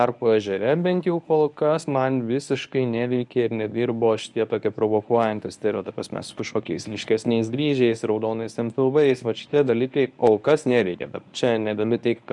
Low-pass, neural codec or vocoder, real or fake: 10.8 kHz; codec, 24 kHz, 0.9 kbps, WavTokenizer, medium speech release version 1; fake